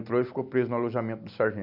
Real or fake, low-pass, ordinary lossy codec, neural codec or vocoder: real; 5.4 kHz; Opus, 64 kbps; none